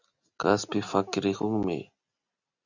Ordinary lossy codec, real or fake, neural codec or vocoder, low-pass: Opus, 64 kbps; real; none; 7.2 kHz